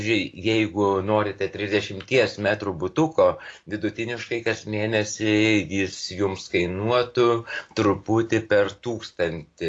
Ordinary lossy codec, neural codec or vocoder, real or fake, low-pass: AAC, 48 kbps; none; real; 9.9 kHz